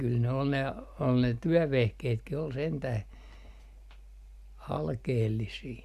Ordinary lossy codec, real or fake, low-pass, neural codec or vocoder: Opus, 64 kbps; real; 14.4 kHz; none